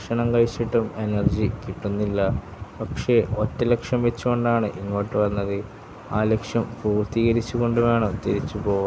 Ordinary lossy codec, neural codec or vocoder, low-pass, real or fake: none; none; none; real